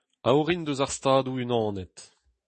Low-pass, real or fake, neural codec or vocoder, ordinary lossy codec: 10.8 kHz; fake; autoencoder, 48 kHz, 128 numbers a frame, DAC-VAE, trained on Japanese speech; MP3, 32 kbps